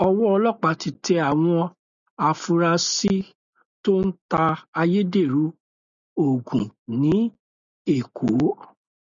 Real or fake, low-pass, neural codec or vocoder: real; 7.2 kHz; none